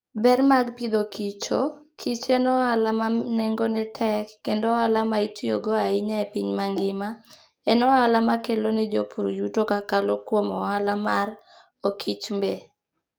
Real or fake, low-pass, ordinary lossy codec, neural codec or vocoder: fake; none; none; codec, 44.1 kHz, 7.8 kbps, DAC